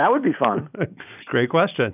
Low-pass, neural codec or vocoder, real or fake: 3.6 kHz; none; real